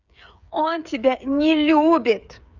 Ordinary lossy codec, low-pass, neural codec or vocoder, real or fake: none; 7.2 kHz; codec, 16 kHz, 8 kbps, FreqCodec, smaller model; fake